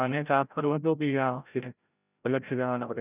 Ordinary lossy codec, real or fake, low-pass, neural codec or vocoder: none; fake; 3.6 kHz; codec, 16 kHz, 0.5 kbps, FreqCodec, larger model